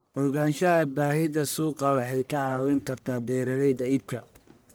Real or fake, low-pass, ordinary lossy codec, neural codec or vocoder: fake; none; none; codec, 44.1 kHz, 1.7 kbps, Pupu-Codec